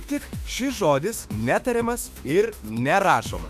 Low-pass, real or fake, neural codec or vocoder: 14.4 kHz; fake; autoencoder, 48 kHz, 32 numbers a frame, DAC-VAE, trained on Japanese speech